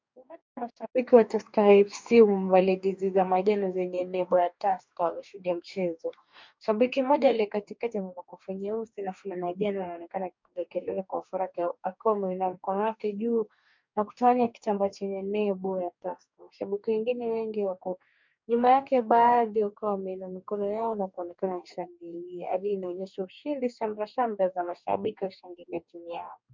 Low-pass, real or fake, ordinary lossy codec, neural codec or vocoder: 7.2 kHz; fake; MP3, 64 kbps; codec, 44.1 kHz, 2.6 kbps, DAC